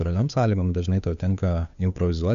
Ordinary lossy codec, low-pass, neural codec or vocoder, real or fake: MP3, 96 kbps; 7.2 kHz; codec, 16 kHz, 2 kbps, FunCodec, trained on Chinese and English, 25 frames a second; fake